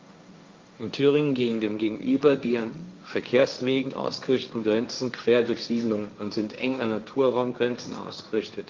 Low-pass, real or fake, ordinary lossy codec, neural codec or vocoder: 7.2 kHz; fake; Opus, 24 kbps; codec, 16 kHz, 1.1 kbps, Voila-Tokenizer